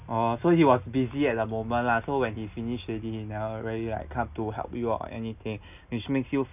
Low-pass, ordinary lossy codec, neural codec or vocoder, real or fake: 3.6 kHz; none; none; real